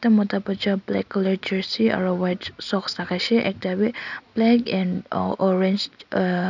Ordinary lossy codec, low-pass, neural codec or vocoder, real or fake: none; 7.2 kHz; none; real